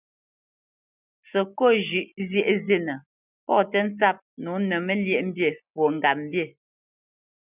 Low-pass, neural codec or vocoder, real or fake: 3.6 kHz; none; real